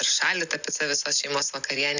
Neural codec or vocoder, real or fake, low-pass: none; real; 7.2 kHz